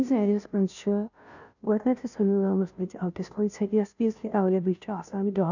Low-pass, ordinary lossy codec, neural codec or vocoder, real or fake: 7.2 kHz; none; codec, 16 kHz, 0.5 kbps, FunCodec, trained on Chinese and English, 25 frames a second; fake